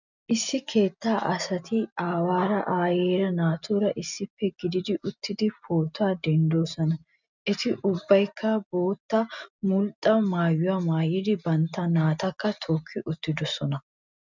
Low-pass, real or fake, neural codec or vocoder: 7.2 kHz; real; none